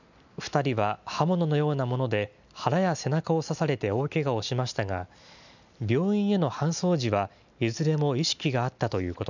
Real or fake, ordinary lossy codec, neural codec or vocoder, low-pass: real; none; none; 7.2 kHz